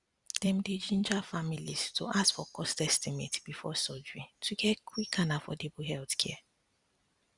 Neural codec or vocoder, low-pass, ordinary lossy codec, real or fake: vocoder, 44.1 kHz, 128 mel bands every 256 samples, BigVGAN v2; 10.8 kHz; Opus, 64 kbps; fake